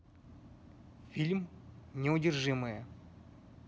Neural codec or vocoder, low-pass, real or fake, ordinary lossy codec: none; none; real; none